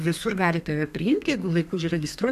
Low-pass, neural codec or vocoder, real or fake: 14.4 kHz; codec, 32 kHz, 1.9 kbps, SNAC; fake